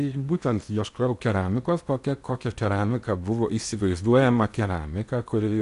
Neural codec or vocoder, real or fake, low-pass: codec, 16 kHz in and 24 kHz out, 0.8 kbps, FocalCodec, streaming, 65536 codes; fake; 10.8 kHz